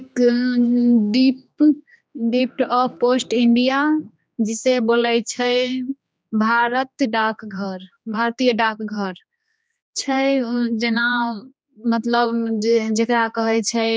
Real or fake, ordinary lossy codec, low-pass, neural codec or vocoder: fake; none; none; codec, 16 kHz, 2 kbps, X-Codec, HuBERT features, trained on general audio